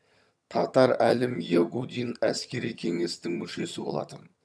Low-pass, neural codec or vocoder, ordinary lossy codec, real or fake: none; vocoder, 22.05 kHz, 80 mel bands, HiFi-GAN; none; fake